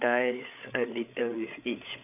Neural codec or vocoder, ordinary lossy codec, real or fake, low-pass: codec, 16 kHz, 8 kbps, FreqCodec, larger model; none; fake; 3.6 kHz